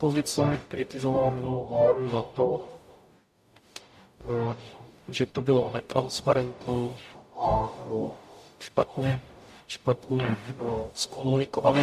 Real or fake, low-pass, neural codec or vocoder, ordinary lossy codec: fake; 14.4 kHz; codec, 44.1 kHz, 0.9 kbps, DAC; MP3, 64 kbps